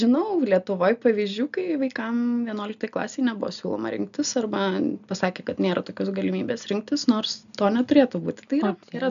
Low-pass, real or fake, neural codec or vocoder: 7.2 kHz; real; none